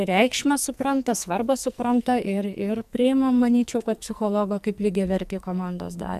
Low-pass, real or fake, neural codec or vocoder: 14.4 kHz; fake; codec, 44.1 kHz, 2.6 kbps, SNAC